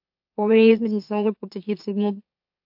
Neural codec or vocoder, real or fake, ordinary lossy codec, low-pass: autoencoder, 44.1 kHz, a latent of 192 numbers a frame, MeloTTS; fake; AAC, 48 kbps; 5.4 kHz